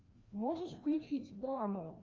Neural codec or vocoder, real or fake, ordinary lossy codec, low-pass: codec, 16 kHz, 1 kbps, FreqCodec, larger model; fake; Opus, 32 kbps; 7.2 kHz